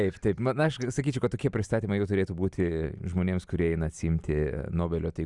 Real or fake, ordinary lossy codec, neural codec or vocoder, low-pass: real; Opus, 64 kbps; none; 10.8 kHz